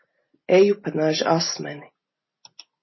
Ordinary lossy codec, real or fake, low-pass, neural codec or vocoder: MP3, 24 kbps; real; 7.2 kHz; none